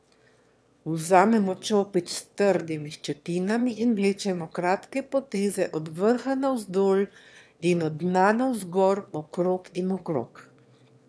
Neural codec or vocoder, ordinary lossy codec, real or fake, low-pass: autoencoder, 22.05 kHz, a latent of 192 numbers a frame, VITS, trained on one speaker; none; fake; none